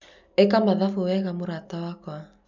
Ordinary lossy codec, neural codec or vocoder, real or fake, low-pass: none; none; real; 7.2 kHz